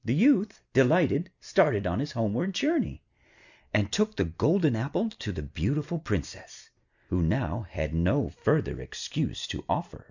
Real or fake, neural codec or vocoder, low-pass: real; none; 7.2 kHz